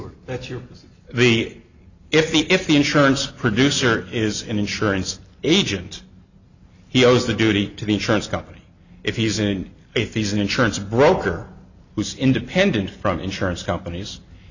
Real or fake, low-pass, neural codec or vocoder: real; 7.2 kHz; none